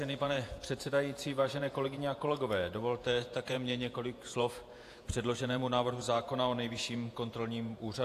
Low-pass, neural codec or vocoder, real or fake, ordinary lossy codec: 14.4 kHz; vocoder, 48 kHz, 128 mel bands, Vocos; fake; AAC, 64 kbps